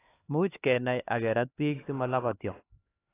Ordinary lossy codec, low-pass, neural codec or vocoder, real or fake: AAC, 16 kbps; 3.6 kHz; codec, 16 kHz, 2 kbps, X-Codec, HuBERT features, trained on LibriSpeech; fake